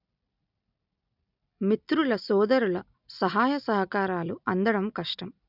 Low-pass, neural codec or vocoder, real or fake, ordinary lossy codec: 5.4 kHz; none; real; none